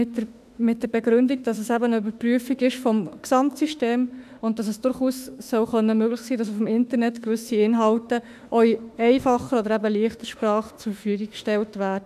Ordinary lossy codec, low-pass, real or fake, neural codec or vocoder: none; 14.4 kHz; fake; autoencoder, 48 kHz, 32 numbers a frame, DAC-VAE, trained on Japanese speech